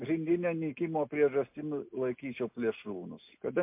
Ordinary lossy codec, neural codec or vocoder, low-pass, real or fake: MP3, 32 kbps; none; 3.6 kHz; real